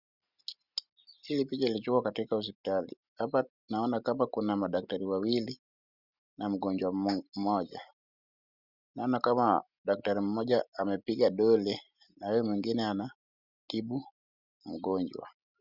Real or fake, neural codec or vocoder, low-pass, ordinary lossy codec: real; none; 5.4 kHz; Opus, 64 kbps